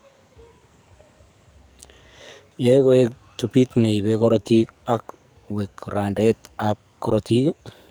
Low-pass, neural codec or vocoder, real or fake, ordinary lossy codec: none; codec, 44.1 kHz, 2.6 kbps, SNAC; fake; none